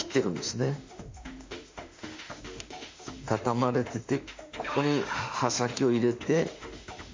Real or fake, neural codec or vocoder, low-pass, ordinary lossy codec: fake; autoencoder, 48 kHz, 32 numbers a frame, DAC-VAE, trained on Japanese speech; 7.2 kHz; MP3, 48 kbps